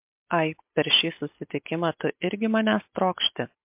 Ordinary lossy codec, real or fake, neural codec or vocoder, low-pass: MP3, 32 kbps; real; none; 3.6 kHz